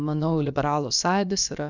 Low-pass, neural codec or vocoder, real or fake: 7.2 kHz; codec, 16 kHz, about 1 kbps, DyCAST, with the encoder's durations; fake